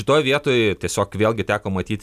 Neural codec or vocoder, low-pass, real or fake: none; 14.4 kHz; real